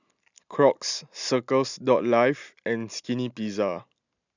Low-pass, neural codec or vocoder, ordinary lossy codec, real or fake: 7.2 kHz; none; none; real